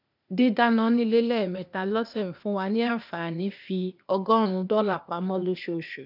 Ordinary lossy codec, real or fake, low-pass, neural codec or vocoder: none; fake; 5.4 kHz; codec, 16 kHz, 0.8 kbps, ZipCodec